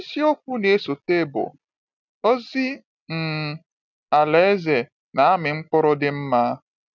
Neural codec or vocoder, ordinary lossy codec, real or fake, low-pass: none; none; real; 7.2 kHz